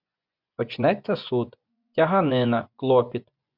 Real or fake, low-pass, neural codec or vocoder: fake; 5.4 kHz; vocoder, 24 kHz, 100 mel bands, Vocos